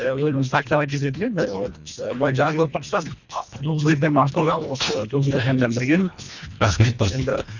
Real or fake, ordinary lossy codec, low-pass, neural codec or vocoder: fake; none; 7.2 kHz; codec, 24 kHz, 1.5 kbps, HILCodec